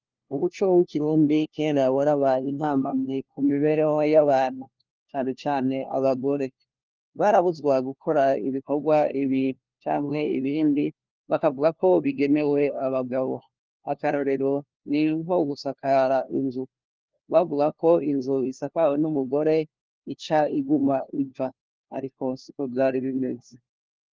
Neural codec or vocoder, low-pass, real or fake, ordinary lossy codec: codec, 16 kHz, 1 kbps, FunCodec, trained on LibriTTS, 50 frames a second; 7.2 kHz; fake; Opus, 32 kbps